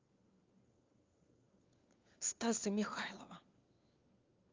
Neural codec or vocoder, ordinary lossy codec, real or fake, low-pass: codec, 16 kHz, 4 kbps, FunCodec, trained on LibriTTS, 50 frames a second; Opus, 24 kbps; fake; 7.2 kHz